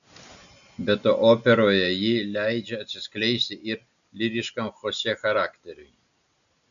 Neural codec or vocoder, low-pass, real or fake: none; 7.2 kHz; real